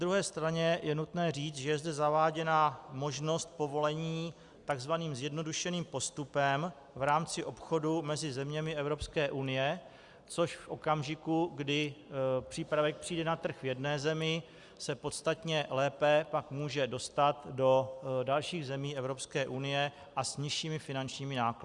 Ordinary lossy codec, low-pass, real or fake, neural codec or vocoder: Opus, 64 kbps; 10.8 kHz; real; none